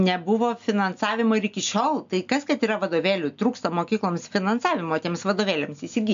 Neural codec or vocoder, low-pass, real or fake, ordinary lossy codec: none; 7.2 kHz; real; MP3, 64 kbps